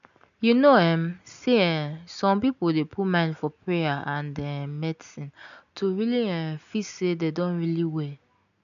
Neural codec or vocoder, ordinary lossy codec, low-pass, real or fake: none; none; 7.2 kHz; real